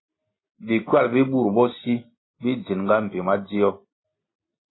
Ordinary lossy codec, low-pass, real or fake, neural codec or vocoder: AAC, 16 kbps; 7.2 kHz; real; none